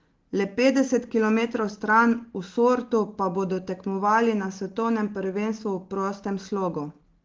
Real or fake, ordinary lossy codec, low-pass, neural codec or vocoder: real; Opus, 16 kbps; 7.2 kHz; none